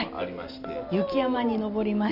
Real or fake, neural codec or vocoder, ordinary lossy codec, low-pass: real; none; none; 5.4 kHz